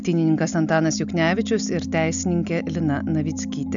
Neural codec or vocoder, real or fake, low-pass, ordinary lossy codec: none; real; 7.2 kHz; MP3, 96 kbps